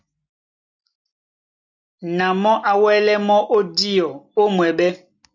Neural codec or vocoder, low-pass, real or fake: none; 7.2 kHz; real